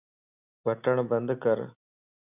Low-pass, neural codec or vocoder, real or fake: 3.6 kHz; none; real